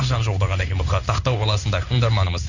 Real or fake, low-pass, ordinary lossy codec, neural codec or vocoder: fake; 7.2 kHz; none; codec, 16 kHz in and 24 kHz out, 1 kbps, XY-Tokenizer